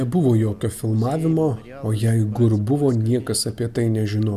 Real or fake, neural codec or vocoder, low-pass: real; none; 14.4 kHz